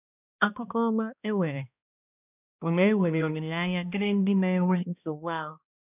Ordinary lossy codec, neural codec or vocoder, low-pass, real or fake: none; codec, 16 kHz, 1 kbps, X-Codec, HuBERT features, trained on balanced general audio; 3.6 kHz; fake